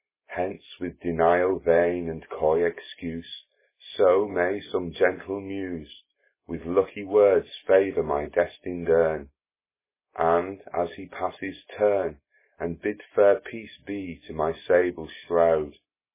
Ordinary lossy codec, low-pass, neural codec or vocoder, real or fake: MP3, 16 kbps; 3.6 kHz; none; real